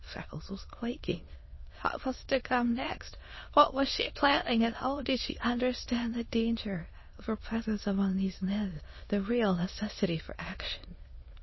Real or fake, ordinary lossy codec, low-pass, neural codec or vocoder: fake; MP3, 24 kbps; 7.2 kHz; autoencoder, 22.05 kHz, a latent of 192 numbers a frame, VITS, trained on many speakers